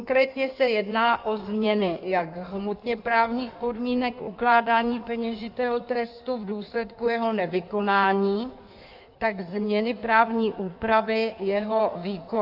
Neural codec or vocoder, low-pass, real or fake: codec, 16 kHz in and 24 kHz out, 1.1 kbps, FireRedTTS-2 codec; 5.4 kHz; fake